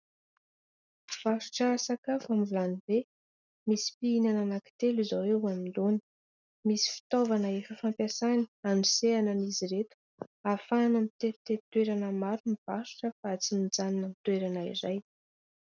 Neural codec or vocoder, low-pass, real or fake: none; 7.2 kHz; real